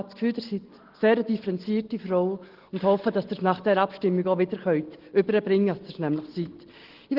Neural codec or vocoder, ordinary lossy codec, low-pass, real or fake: none; Opus, 16 kbps; 5.4 kHz; real